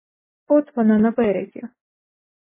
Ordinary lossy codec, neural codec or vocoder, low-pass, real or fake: MP3, 16 kbps; none; 3.6 kHz; real